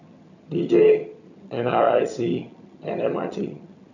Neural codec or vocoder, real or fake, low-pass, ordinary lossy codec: vocoder, 22.05 kHz, 80 mel bands, HiFi-GAN; fake; 7.2 kHz; none